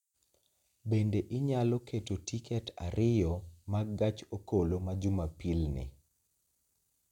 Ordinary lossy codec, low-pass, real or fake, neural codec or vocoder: none; 19.8 kHz; real; none